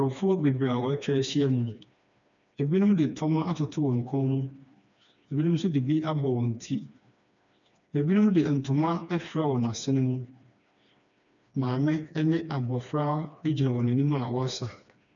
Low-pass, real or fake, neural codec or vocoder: 7.2 kHz; fake; codec, 16 kHz, 2 kbps, FreqCodec, smaller model